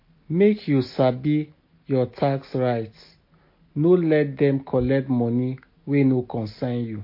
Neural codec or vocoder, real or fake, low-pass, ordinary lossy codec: none; real; 5.4 kHz; MP3, 32 kbps